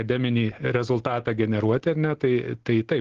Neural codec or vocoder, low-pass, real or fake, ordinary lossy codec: none; 7.2 kHz; real; Opus, 16 kbps